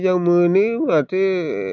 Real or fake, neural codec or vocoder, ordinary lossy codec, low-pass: real; none; none; 7.2 kHz